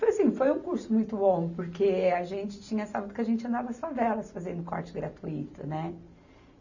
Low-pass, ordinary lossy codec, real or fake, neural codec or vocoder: 7.2 kHz; none; real; none